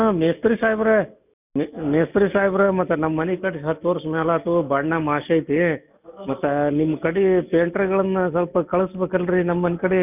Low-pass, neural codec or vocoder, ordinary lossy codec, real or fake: 3.6 kHz; none; none; real